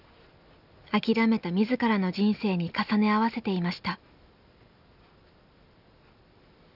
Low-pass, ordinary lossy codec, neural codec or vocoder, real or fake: 5.4 kHz; none; none; real